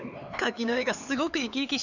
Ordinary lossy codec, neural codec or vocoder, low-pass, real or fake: none; codec, 16 kHz, 4 kbps, X-Codec, HuBERT features, trained on LibriSpeech; 7.2 kHz; fake